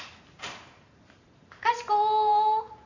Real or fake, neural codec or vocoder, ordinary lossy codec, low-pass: fake; vocoder, 44.1 kHz, 128 mel bands every 512 samples, BigVGAN v2; none; 7.2 kHz